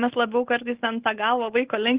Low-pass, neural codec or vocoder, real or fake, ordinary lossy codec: 3.6 kHz; none; real; Opus, 16 kbps